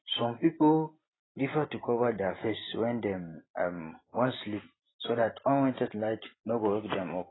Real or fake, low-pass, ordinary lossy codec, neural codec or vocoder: fake; 7.2 kHz; AAC, 16 kbps; vocoder, 44.1 kHz, 128 mel bands every 512 samples, BigVGAN v2